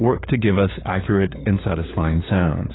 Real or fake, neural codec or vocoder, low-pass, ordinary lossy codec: fake; codec, 16 kHz, 4 kbps, FunCodec, trained on LibriTTS, 50 frames a second; 7.2 kHz; AAC, 16 kbps